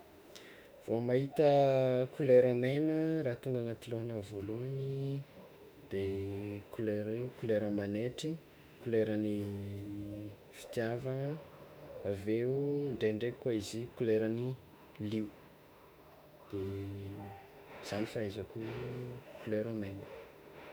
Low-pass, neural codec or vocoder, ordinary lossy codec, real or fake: none; autoencoder, 48 kHz, 32 numbers a frame, DAC-VAE, trained on Japanese speech; none; fake